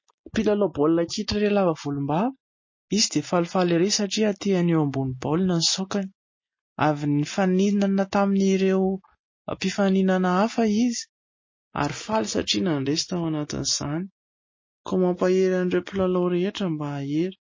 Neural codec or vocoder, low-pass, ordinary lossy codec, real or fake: none; 7.2 kHz; MP3, 32 kbps; real